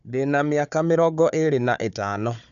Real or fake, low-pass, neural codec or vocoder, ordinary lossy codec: fake; 7.2 kHz; codec, 16 kHz, 4 kbps, FunCodec, trained on Chinese and English, 50 frames a second; none